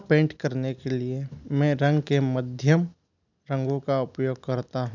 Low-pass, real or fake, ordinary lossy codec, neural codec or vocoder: 7.2 kHz; real; none; none